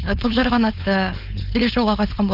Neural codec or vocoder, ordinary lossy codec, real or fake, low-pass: codec, 16 kHz, 4.8 kbps, FACodec; none; fake; 5.4 kHz